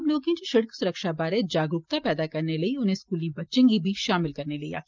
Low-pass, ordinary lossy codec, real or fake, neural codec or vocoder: 7.2 kHz; Opus, 24 kbps; real; none